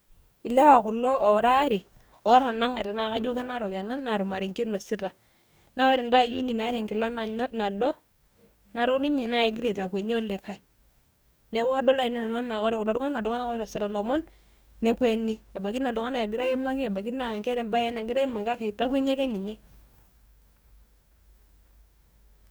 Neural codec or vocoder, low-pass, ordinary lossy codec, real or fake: codec, 44.1 kHz, 2.6 kbps, DAC; none; none; fake